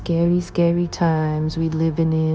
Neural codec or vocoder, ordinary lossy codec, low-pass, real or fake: codec, 16 kHz, 0.9 kbps, LongCat-Audio-Codec; none; none; fake